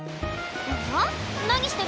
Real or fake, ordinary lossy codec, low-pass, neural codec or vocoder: real; none; none; none